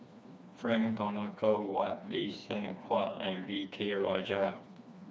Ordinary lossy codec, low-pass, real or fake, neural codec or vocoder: none; none; fake; codec, 16 kHz, 2 kbps, FreqCodec, smaller model